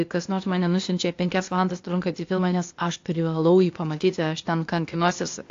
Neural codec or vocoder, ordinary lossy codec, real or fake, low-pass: codec, 16 kHz, 0.8 kbps, ZipCodec; AAC, 48 kbps; fake; 7.2 kHz